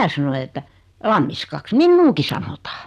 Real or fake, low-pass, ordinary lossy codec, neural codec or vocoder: real; 14.4 kHz; none; none